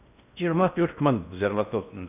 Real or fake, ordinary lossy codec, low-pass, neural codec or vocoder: fake; none; 3.6 kHz; codec, 16 kHz in and 24 kHz out, 0.6 kbps, FocalCodec, streaming, 4096 codes